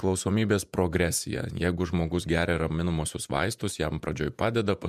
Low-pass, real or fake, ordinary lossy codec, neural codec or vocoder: 14.4 kHz; fake; MP3, 96 kbps; vocoder, 48 kHz, 128 mel bands, Vocos